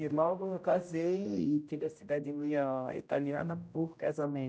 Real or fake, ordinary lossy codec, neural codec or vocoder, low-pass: fake; none; codec, 16 kHz, 0.5 kbps, X-Codec, HuBERT features, trained on general audio; none